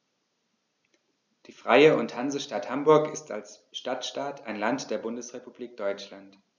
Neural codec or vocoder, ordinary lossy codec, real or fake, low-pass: none; none; real; 7.2 kHz